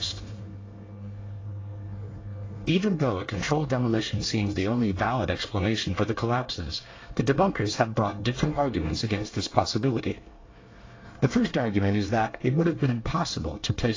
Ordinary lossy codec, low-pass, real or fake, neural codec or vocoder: AAC, 32 kbps; 7.2 kHz; fake; codec, 24 kHz, 1 kbps, SNAC